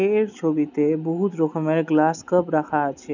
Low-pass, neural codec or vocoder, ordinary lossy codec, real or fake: 7.2 kHz; none; none; real